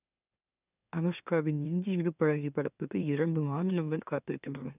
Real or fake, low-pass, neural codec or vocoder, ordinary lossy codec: fake; 3.6 kHz; autoencoder, 44.1 kHz, a latent of 192 numbers a frame, MeloTTS; none